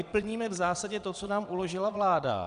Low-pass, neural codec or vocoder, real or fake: 9.9 kHz; vocoder, 22.05 kHz, 80 mel bands, WaveNeXt; fake